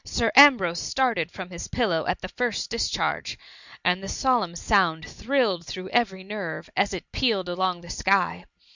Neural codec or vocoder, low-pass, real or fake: none; 7.2 kHz; real